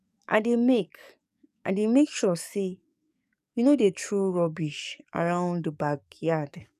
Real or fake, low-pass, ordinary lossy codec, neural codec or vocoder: fake; 14.4 kHz; none; codec, 44.1 kHz, 7.8 kbps, DAC